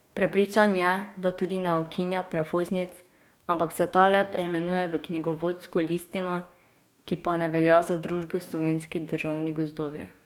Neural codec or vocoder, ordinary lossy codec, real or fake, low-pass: codec, 44.1 kHz, 2.6 kbps, DAC; none; fake; 19.8 kHz